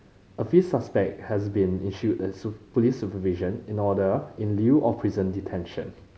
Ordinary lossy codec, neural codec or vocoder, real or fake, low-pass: none; none; real; none